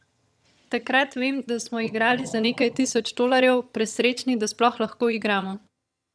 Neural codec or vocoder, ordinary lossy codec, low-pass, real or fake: vocoder, 22.05 kHz, 80 mel bands, HiFi-GAN; none; none; fake